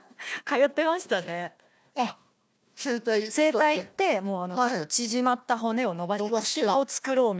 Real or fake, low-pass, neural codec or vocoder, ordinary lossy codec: fake; none; codec, 16 kHz, 1 kbps, FunCodec, trained on Chinese and English, 50 frames a second; none